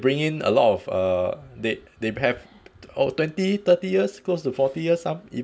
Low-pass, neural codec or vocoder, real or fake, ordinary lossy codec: none; none; real; none